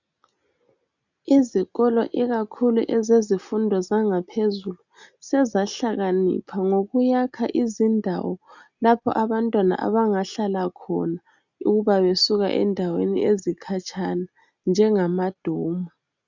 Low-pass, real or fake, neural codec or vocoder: 7.2 kHz; real; none